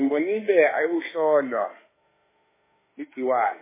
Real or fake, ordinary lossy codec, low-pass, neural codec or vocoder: fake; MP3, 16 kbps; 3.6 kHz; autoencoder, 48 kHz, 32 numbers a frame, DAC-VAE, trained on Japanese speech